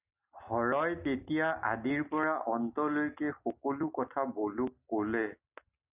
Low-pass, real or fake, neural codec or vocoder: 3.6 kHz; real; none